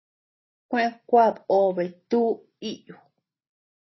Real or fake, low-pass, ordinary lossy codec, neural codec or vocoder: real; 7.2 kHz; MP3, 24 kbps; none